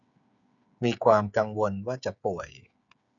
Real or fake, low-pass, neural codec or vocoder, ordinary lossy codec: fake; 7.2 kHz; codec, 16 kHz, 8 kbps, FreqCodec, smaller model; none